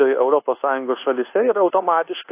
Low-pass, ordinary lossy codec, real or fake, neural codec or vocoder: 3.6 kHz; AAC, 24 kbps; fake; codec, 24 kHz, 1.2 kbps, DualCodec